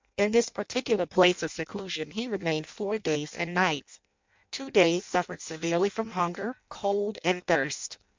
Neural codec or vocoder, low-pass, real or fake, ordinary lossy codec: codec, 16 kHz in and 24 kHz out, 0.6 kbps, FireRedTTS-2 codec; 7.2 kHz; fake; MP3, 64 kbps